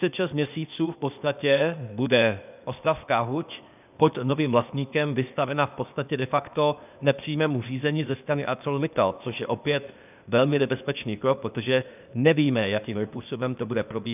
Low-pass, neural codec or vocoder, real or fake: 3.6 kHz; codec, 16 kHz, 0.8 kbps, ZipCodec; fake